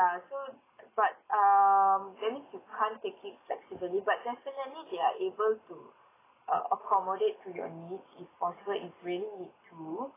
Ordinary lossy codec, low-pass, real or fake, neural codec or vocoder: AAC, 16 kbps; 3.6 kHz; real; none